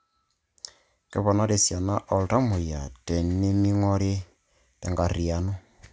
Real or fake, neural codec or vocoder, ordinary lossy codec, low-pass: real; none; none; none